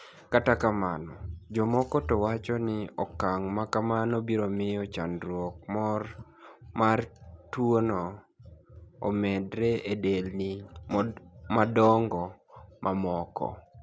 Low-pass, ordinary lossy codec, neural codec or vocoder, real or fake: none; none; none; real